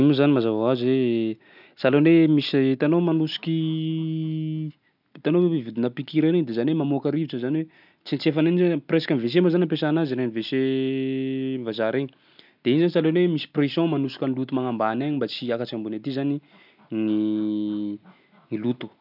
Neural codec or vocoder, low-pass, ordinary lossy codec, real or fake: none; 5.4 kHz; none; real